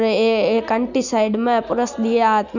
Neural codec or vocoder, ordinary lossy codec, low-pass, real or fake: none; none; 7.2 kHz; real